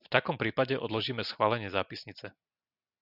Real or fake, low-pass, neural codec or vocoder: real; 5.4 kHz; none